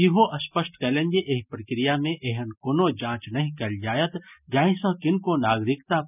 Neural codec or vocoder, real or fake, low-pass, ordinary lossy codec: none; real; 3.6 kHz; none